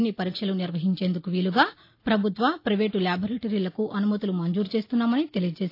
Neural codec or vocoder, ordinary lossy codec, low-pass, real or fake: none; AAC, 32 kbps; 5.4 kHz; real